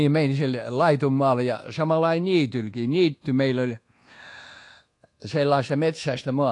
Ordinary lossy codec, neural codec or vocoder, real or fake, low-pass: AAC, 48 kbps; codec, 24 kHz, 1.2 kbps, DualCodec; fake; 10.8 kHz